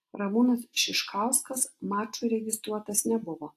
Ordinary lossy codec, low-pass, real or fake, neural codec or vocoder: AAC, 64 kbps; 14.4 kHz; real; none